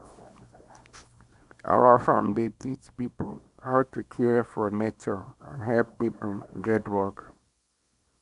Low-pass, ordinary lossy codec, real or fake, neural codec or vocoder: 10.8 kHz; none; fake; codec, 24 kHz, 0.9 kbps, WavTokenizer, small release